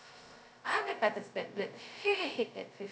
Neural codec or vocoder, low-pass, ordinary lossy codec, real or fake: codec, 16 kHz, 0.2 kbps, FocalCodec; none; none; fake